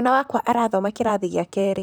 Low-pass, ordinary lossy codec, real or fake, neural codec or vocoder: none; none; fake; vocoder, 44.1 kHz, 128 mel bands, Pupu-Vocoder